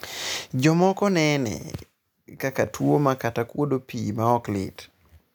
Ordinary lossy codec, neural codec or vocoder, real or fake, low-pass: none; none; real; none